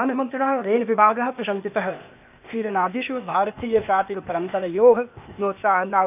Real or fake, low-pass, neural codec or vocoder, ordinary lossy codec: fake; 3.6 kHz; codec, 16 kHz, 0.8 kbps, ZipCodec; none